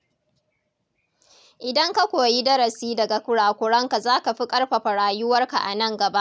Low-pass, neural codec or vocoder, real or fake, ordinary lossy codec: none; none; real; none